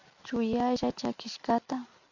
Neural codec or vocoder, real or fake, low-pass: none; real; 7.2 kHz